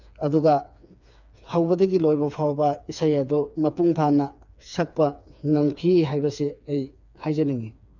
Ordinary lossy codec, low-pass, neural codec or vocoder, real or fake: none; 7.2 kHz; codec, 16 kHz, 4 kbps, FreqCodec, smaller model; fake